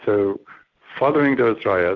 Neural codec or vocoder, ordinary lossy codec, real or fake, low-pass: none; MP3, 64 kbps; real; 7.2 kHz